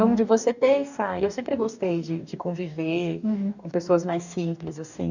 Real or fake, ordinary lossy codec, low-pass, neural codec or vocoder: fake; none; 7.2 kHz; codec, 44.1 kHz, 2.6 kbps, DAC